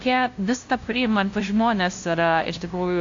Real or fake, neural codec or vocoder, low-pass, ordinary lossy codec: fake; codec, 16 kHz, 0.5 kbps, FunCodec, trained on LibriTTS, 25 frames a second; 7.2 kHz; AAC, 48 kbps